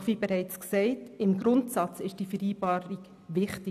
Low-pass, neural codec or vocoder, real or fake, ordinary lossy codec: 14.4 kHz; none; real; none